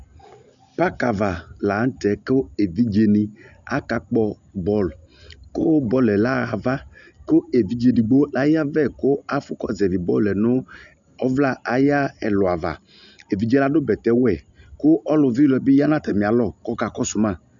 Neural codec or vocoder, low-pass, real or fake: none; 7.2 kHz; real